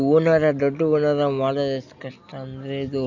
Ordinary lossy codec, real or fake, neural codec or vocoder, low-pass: none; real; none; 7.2 kHz